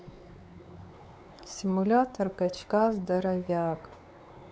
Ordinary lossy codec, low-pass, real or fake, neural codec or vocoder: none; none; fake; codec, 16 kHz, 4 kbps, X-Codec, WavLM features, trained on Multilingual LibriSpeech